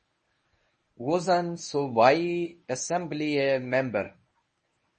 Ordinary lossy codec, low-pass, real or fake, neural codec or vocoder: MP3, 32 kbps; 10.8 kHz; fake; codec, 24 kHz, 0.9 kbps, WavTokenizer, medium speech release version 1